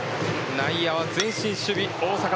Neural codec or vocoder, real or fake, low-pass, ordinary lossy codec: none; real; none; none